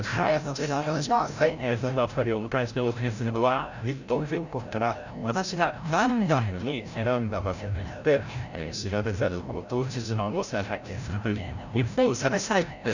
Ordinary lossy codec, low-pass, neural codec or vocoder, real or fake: none; 7.2 kHz; codec, 16 kHz, 0.5 kbps, FreqCodec, larger model; fake